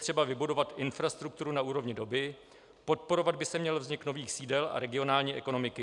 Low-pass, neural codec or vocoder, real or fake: 10.8 kHz; none; real